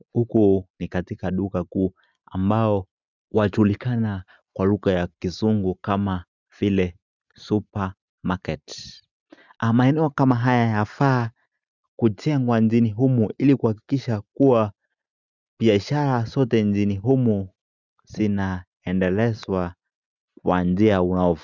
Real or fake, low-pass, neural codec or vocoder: real; 7.2 kHz; none